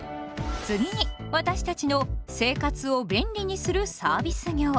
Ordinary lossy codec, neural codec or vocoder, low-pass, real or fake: none; none; none; real